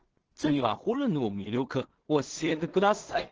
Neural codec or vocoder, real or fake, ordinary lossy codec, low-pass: codec, 16 kHz in and 24 kHz out, 0.4 kbps, LongCat-Audio-Codec, two codebook decoder; fake; Opus, 16 kbps; 7.2 kHz